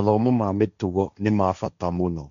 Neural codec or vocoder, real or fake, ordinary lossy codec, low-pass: codec, 16 kHz, 1.1 kbps, Voila-Tokenizer; fake; MP3, 96 kbps; 7.2 kHz